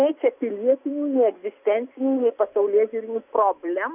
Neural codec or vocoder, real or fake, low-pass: none; real; 3.6 kHz